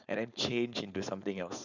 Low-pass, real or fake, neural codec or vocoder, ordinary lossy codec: 7.2 kHz; fake; codec, 16 kHz, 4.8 kbps, FACodec; none